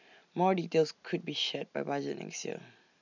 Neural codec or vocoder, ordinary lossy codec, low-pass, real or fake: none; none; 7.2 kHz; real